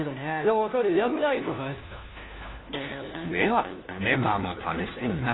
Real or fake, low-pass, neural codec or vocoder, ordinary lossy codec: fake; 7.2 kHz; codec, 16 kHz, 1 kbps, FunCodec, trained on LibriTTS, 50 frames a second; AAC, 16 kbps